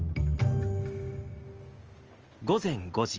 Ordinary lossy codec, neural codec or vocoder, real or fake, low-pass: Opus, 24 kbps; none; real; 7.2 kHz